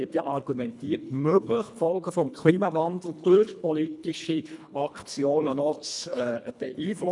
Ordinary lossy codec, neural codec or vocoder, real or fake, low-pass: none; codec, 24 kHz, 1.5 kbps, HILCodec; fake; 10.8 kHz